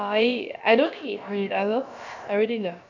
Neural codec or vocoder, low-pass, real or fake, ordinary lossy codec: codec, 16 kHz, about 1 kbps, DyCAST, with the encoder's durations; 7.2 kHz; fake; none